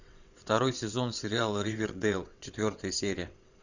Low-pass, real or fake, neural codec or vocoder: 7.2 kHz; fake; vocoder, 22.05 kHz, 80 mel bands, WaveNeXt